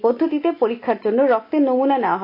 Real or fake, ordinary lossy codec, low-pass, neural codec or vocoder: real; AAC, 32 kbps; 5.4 kHz; none